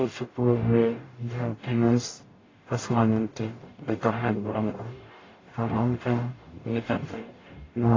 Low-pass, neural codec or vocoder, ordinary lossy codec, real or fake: 7.2 kHz; codec, 44.1 kHz, 0.9 kbps, DAC; AAC, 32 kbps; fake